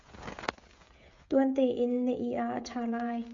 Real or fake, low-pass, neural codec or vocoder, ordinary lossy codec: fake; 7.2 kHz; codec, 16 kHz, 16 kbps, FreqCodec, smaller model; MP3, 48 kbps